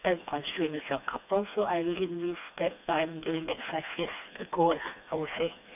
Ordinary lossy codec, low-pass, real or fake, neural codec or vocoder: none; 3.6 kHz; fake; codec, 16 kHz, 2 kbps, FreqCodec, smaller model